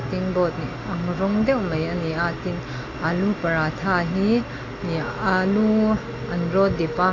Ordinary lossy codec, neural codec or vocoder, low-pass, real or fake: AAC, 32 kbps; none; 7.2 kHz; real